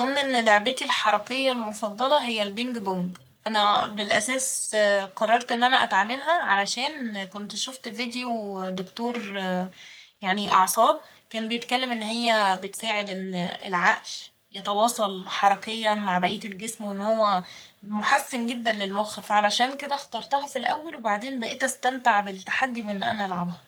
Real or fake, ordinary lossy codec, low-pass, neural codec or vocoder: fake; none; none; codec, 44.1 kHz, 3.4 kbps, Pupu-Codec